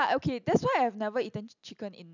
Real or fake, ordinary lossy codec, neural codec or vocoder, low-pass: real; none; none; 7.2 kHz